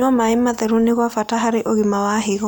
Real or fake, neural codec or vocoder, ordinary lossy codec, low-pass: real; none; none; none